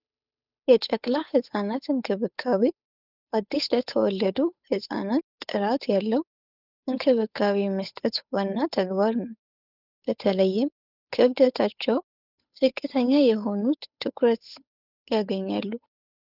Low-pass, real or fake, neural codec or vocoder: 5.4 kHz; fake; codec, 16 kHz, 8 kbps, FunCodec, trained on Chinese and English, 25 frames a second